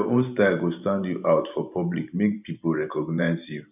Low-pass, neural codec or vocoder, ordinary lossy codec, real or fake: 3.6 kHz; vocoder, 44.1 kHz, 128 mel bands every 512 samples, BigVGAN v2; none; fake